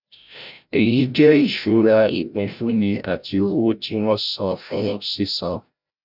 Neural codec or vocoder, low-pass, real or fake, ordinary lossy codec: codec, 16 kHz, 0.5 kbps, FreqCodec, larger model; 5.4 kHz; fake; none